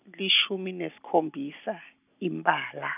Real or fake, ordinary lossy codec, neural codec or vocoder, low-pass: real; none; none; 3.6 kHz